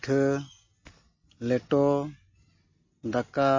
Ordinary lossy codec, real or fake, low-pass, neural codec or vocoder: MP3, 32 kbps; real; 7.2 kHz; none